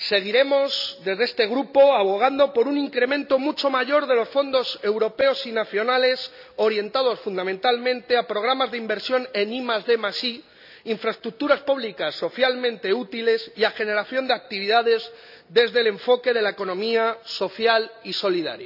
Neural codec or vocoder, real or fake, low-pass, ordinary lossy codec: none; real; 5.4 kHz; none